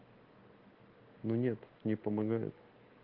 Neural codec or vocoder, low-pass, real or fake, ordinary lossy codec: none; 5.4 kHz; real; Opus, 16 kbps